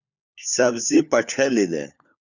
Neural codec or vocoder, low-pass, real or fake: codec, 16 kHz, 4 kbps, FunCodec, trained on LibriTTS, 50 frames a second; 7.2 kHz; fake